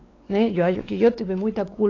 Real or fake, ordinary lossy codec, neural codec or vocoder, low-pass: fake; AAC, 32 kbps; codec, 16 kHz, 4 kbps, X-Codec, WavLM features, trained on Multilingual LibriSpeech; 7.2 kHz